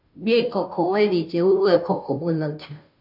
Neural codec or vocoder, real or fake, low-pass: codec, 16 kHz, 0.5 kbps, FunCodec, trained on Chinese and English, 25 frames a second; fake; 5.4 kHz